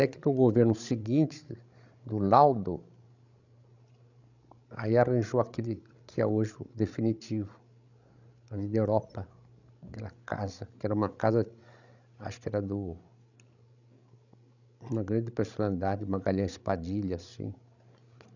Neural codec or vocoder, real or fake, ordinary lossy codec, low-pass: codec, 16 kHz, 16 kbps, FreqCodec, larger model; fake; none; 7.2 kHz